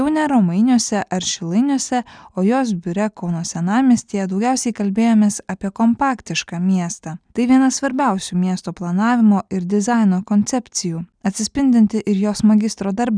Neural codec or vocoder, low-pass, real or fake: none; 9.9 kHz; real